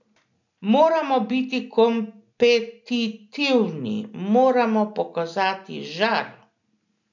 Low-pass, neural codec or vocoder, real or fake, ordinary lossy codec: 7.2 kHz; none; real; none